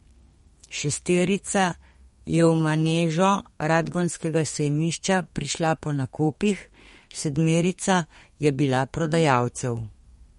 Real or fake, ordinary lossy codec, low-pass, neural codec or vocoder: fake; MP3, 48 kbps; 14.4 kHz; codec, 32 kHz, 1.9 kbps, SNAC